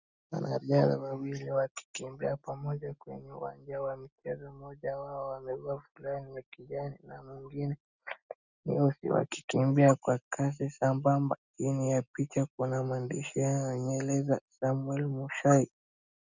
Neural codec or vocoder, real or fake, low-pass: none; real; 7.2 kHz